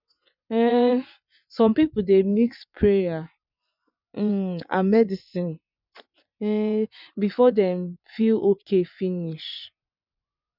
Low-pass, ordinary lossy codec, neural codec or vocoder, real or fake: 5.4 kHz; none; vocoder, 24 kHz, 100 mel bands, Vocos; fake